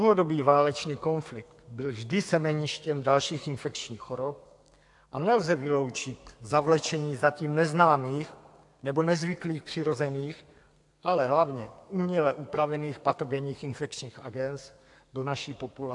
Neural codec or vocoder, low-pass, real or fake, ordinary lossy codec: codec, 32 kHz, 1.9 kbps, SNAC; 10.8 kHz; fake; AAC, 64 kbps